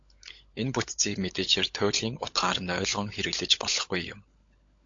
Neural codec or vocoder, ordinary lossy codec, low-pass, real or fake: codec, 16 kHz, 16 kbps, FunCodec, trained on LibriTTS, 50 frames a second; AAC, 48 kbps; 7.2 kHz; fake